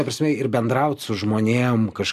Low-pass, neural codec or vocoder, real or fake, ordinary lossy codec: 14.4 kHz; none; real; MP3, 96 kbps